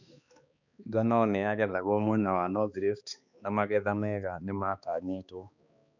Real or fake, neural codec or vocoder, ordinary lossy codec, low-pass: fake; codec, 16 kHz, 2 kbps, X-Codec, HuBERT features, trained on balanced general audio; none; 7.2 kHz